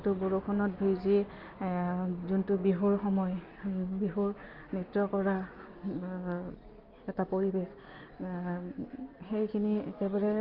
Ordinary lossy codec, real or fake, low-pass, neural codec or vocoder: Opus, 24 kbps; real; 5.4 kHz; none